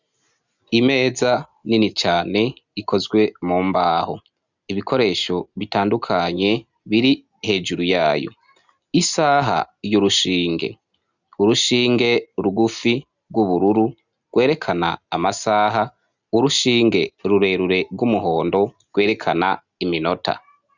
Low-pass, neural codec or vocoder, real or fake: 7.2 kHz; none; real